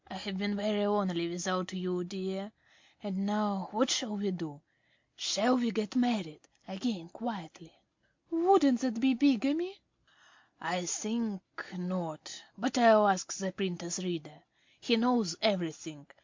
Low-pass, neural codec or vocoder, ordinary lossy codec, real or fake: 7.2 kHz; none; MP3, 48 kbps; real